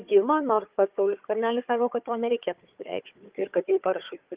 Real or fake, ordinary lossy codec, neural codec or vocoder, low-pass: fake; Opus, 32 kbps; codec, 16 kHz, 4 kbps, FunCodec, trained on Chinese and English, 50 frames a second; 3.6 kHz